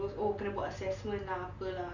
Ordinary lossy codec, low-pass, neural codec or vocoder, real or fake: none; 7.2 kHz; none; real